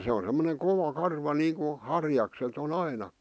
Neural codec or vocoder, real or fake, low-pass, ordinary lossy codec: none; real; none; none